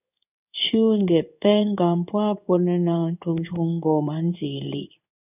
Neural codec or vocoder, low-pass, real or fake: codec, 16 kHz in and 24 kHz out, 1 kbps, XY-Tokenizer; 3.6 kHz; fake